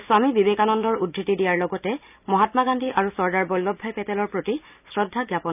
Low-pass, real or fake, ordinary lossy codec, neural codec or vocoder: 3.6 kHz; real; none; none